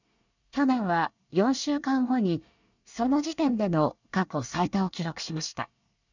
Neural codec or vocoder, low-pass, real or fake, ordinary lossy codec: codec, 24 kHz, 1 kbps, SNAC; 7.2 kHz; fake; none